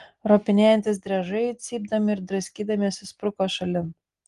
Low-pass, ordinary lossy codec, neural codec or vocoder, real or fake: 10.8 kHz; Opus, 24 kbps; none; real